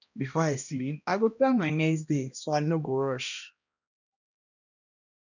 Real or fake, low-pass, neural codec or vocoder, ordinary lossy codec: fake; 7.2 kHz; codec, 16 kHz, 1 kbps, X-Codec, HuBERT features, trained on balanced general audio; none